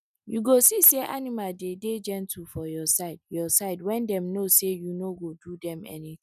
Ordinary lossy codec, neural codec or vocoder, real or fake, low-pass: none; none; real; 14.4 kHz